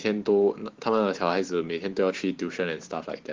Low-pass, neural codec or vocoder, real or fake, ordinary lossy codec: 7.2 kHz; vocoder, 44.1 kHz, 128 mel bands every 512 samples, BigVGAN v2; fake; Opus, 16 kbps